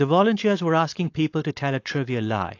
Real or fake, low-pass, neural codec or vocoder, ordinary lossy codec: fake; 7.2 kHz; codec, 16 kHz, 4.8 kbps, FACodec; AAC, 48 kbps